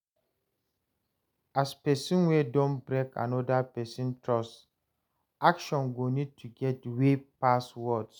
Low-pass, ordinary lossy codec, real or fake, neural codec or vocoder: none; none; real; none